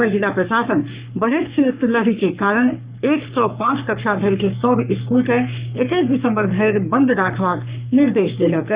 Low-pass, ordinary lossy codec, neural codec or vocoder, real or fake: 3.6 kHz; Opus, 64 kbps; codec, 44.1 kHz, 3.4 kbps, Pupu-Codec; fake